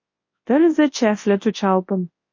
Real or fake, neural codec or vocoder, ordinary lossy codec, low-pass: fake; codec, 24 kHz, 0.9 kbps, WavTokenizer, large speech release; MP3, 32 kbps; 7.2 kHz